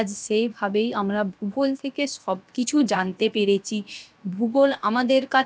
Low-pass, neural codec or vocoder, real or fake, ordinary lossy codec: none; codec, 16 kHz, about 1 kbps, DyCAST, with the encoder's durations; fake; none